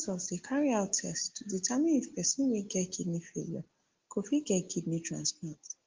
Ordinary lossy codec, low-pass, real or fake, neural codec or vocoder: Opus, 16 kbps; 7.2 kHz; real; none